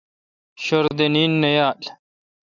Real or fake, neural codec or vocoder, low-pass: real; none; 7.2 kHz